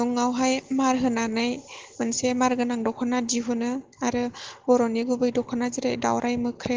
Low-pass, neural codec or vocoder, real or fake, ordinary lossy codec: 7.2 kHz; none; real; Opus, 16 kbps